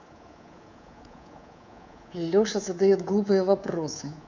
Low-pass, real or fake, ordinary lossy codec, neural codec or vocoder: 7.2 kHz; fake; none; codec, 24 kHz, 3.1 kbps, DualCodec